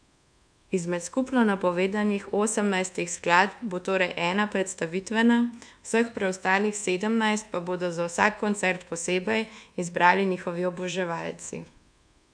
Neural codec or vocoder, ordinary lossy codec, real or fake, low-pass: codec, 24 kHz, 1.2 kbps, DualCodec; none; fake; 9.9 kHz